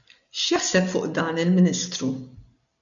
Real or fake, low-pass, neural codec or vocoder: real; 7.2 kHz; none